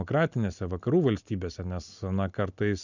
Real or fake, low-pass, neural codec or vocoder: real; 7.2 kHz; none